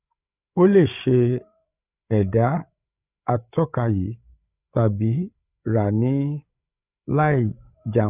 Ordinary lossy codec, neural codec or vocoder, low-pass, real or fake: none; codec, 16 kHz, 8 kbps, FreqCodec, larger model; 3.6 kHz; fake